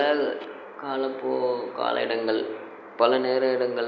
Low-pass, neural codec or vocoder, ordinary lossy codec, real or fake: none; none; none; real